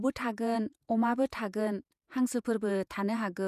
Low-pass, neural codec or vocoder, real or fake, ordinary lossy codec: 14.4 kHz; vocoder, 48 kHz, 128 mel bands, Vocos; fake; none